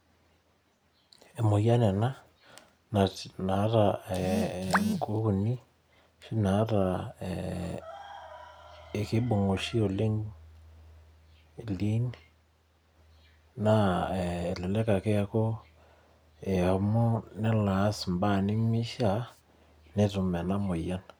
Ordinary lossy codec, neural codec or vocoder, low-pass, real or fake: none; none; none; real